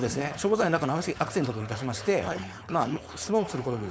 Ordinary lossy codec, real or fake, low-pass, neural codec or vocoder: none; fake; none; codec, 16 kHz, 4.8 kbps, FACodec